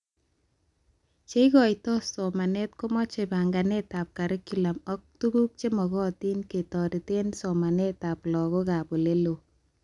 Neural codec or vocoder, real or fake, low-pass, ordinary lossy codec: none; real; 10.8 kHz; none